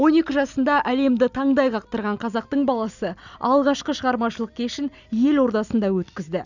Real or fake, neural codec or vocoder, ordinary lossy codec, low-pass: real; none; none; 7.2 kHz